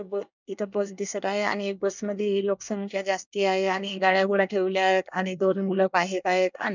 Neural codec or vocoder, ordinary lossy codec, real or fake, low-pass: codec, 24 kHz, 1 kbps, SNAC; none; fake; 7.2 kHz